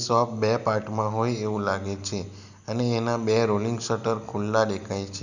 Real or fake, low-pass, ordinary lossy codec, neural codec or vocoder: real; 7.2 kHz; none; none